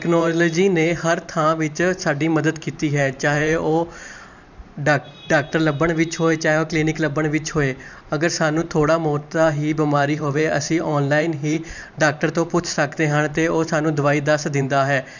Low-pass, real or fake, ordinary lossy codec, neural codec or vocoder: 7.2 kHz; fake; none; vocoder, 44.1 kHz, 128 mel bands every 512 samples, BigVGAN v2